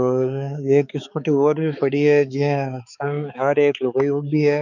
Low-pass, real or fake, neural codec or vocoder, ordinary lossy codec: 7.2 kHz; fake; codec, 16 kHz, 4 kbps, X-Codec, HuBERT features, trained on balanced general audio; none